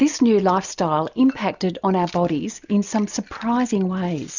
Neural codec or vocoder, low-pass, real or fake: none; 7.2 kHz; real